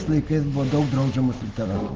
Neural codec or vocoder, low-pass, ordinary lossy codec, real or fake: none; 7.2 kHz; Opus, 16 kbps; real